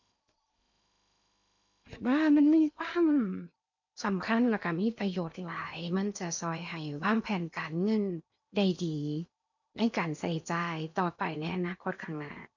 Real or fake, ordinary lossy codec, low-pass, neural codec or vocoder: fake; none; 7.2 kHz; codec, 16 kHz in and 24 kHz out, 0.8 kbps, FocalCodec, streaming, 65536 codes